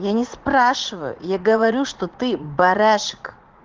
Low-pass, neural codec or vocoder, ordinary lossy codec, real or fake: 7.2 kHz; vocoder, 44.1 kHz, 80 mel bands, Vocos; Opus, 16 kbps; fake